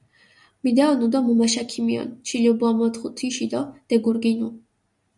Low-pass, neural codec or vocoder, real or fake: 10.8 kHz; none; real